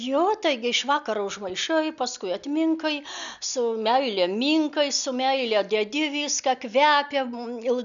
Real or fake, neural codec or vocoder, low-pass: real; none; 7.2 kHz